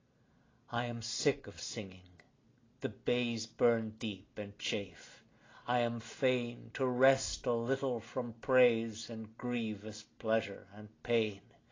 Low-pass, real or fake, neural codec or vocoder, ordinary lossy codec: 7.2 kHz; real; none; AAC, 32 kbps